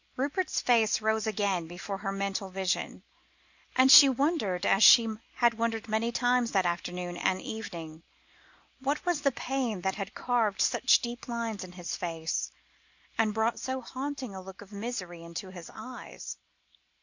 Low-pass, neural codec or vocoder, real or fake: 7.2 kHz; none; real